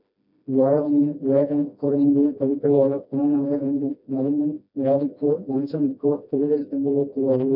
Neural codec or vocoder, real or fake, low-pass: codec, 16 kHz, 1 kbps, FreqCodec, smaller model; fake; 5.4 kHz